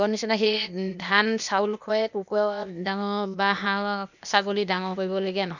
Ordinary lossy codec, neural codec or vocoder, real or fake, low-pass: none; codec, 16 kHz, 0.8 kbps, ZipCodec; fake; 7.2 kHz